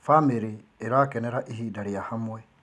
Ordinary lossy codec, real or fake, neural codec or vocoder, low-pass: none; real; none; none